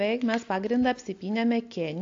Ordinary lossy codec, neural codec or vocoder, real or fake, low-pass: AAC, 48 kbps; none; real; 7.2 kHz